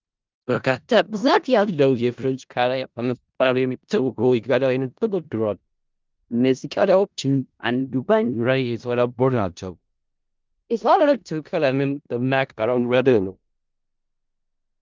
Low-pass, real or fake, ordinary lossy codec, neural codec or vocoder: 7.2 kHz; fake; Opus, 24 kbps; codec, 16 kHz in and 24 kHz out, 0.4 kbps, LongCat-Audio-Codec, four codebook decoder